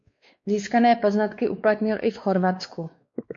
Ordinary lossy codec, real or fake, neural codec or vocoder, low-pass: MP3, 48 kbps; fake; codec, 16 kHz, 2 kbps, X-Codec, WavLM features, trained on Multilingual LibriSpeech; 7.2 kHz